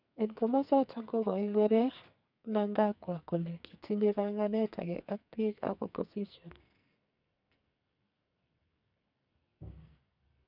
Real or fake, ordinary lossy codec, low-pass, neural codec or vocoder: fake; none; 5.4 kHz; codec, 44.1 kHz, 2.6 kbps, SNAC